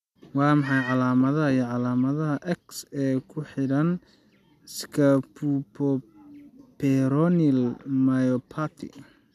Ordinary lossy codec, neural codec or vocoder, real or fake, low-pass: none; none; real; 14.4 kHz